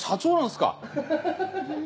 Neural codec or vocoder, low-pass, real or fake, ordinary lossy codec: none; none; real; none